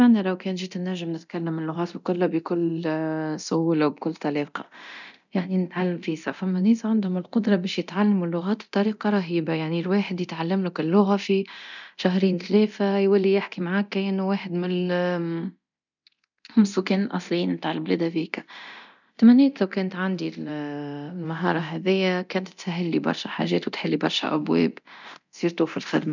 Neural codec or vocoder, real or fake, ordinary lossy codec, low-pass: codec, 24 kHz, 0.9 kbps, DualCodec; fake; none; 7.2 kHz